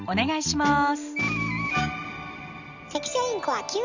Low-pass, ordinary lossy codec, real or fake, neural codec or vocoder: 7.2 kHz; Opus, 64 kbps; real; none